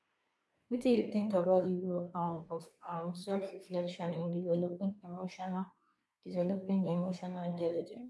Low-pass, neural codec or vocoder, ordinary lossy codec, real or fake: none; codec, 24 kHz, 1 kbps, SNAC; none; fake